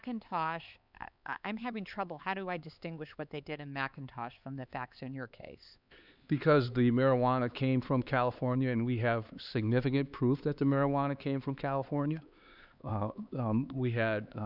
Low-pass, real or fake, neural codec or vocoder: 5.4 kHz; fake; codec, 16 kHz, 4 kbps, X-Codec, HuBERT features, trained on LibriSpeech